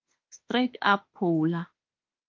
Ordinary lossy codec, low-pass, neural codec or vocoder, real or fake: Opus, 24 kbps; 7.2 kHz; autoencoder, 48 kHz, 32 numbers a frame, DAC-VAE, trained on Japanese speech; fake